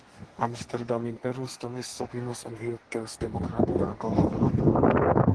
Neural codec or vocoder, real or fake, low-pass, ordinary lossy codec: codec, 32 kHz, 1.9 kbps, SNAC; fake; 10.8 kHz; Opus, 16 kbps